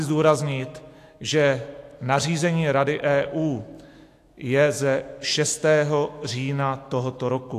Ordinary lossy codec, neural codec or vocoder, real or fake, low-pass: AAC, 64 kbps; autoencoder, 48 kHz, 128 numbers a frame, DAC-VAE, trained on Japanese speech; fake; 14.4 kHz